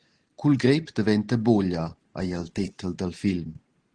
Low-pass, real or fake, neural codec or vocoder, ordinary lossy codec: 9.9 kHz; real; none; Opus, 16 kbps